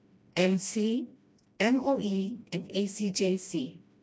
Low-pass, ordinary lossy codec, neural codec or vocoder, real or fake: none; none; codec, 16 kHz, 1 kbps, FreqCodec, smaller model; fake